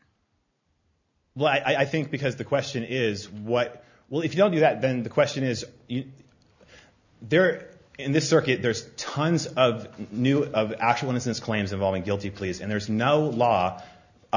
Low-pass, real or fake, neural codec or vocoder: 7.2 kHz; real; none